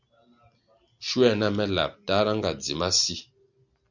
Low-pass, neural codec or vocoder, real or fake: 7.2 kHz; none; real